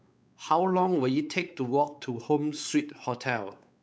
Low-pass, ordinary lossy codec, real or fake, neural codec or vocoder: none; none; fake; codec, 16 kHz, 4 kbps, X-Codec, WavLM features, trained on Multilingual LibriSpeech